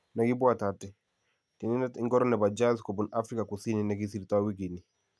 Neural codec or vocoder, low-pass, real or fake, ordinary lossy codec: none; none; real; none